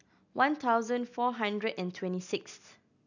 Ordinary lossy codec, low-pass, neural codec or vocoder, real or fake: none; 7.2 kHz; none; real